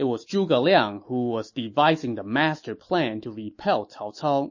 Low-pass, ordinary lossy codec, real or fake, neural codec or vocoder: 7.2 kHz; MP3, 32 kbps; fake; autoencoder, 48 kHz, 128 numbers a frame, DAC-VAE, trained on Japanese speech